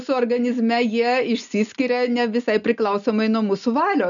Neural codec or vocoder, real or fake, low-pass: none; real; 7.2 kHz